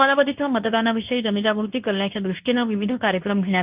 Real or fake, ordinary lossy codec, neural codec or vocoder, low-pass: fake; Opus, 32 kbps; codec, 24 kHz, 0.9 kbps, WavTokenizer, medium speech release version 2; 3.6 kHz